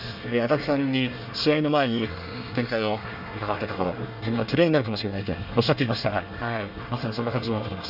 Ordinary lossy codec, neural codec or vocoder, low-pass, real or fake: none; codec, 24 kHz, 1 kbps, SNAC; 5.4 kHz; fake